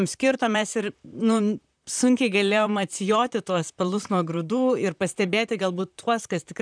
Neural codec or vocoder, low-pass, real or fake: vocoder, 44.1 kHz, 128 mel bands, Pupu-Vocoder; 9.9 kHz; fake